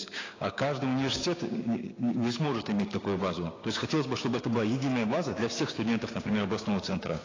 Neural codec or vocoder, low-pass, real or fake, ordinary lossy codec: codec, 16 kHz, 6 kbps, DAC; 7.2 kHz; fake; AAC, 32 kbps